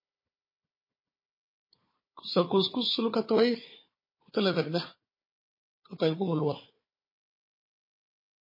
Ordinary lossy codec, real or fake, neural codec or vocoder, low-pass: MP3, 24 kbps; fake; codec, 16 kHz, 4 kbps, FunCodec, trained on Chinese and English, 50 frames a second; 5.4 kHz